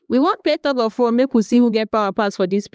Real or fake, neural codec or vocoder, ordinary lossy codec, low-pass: fake; codec, 16 kHz, 2 kbps, X-Codec, HuBERT features, trained on LibriSpeech; none; none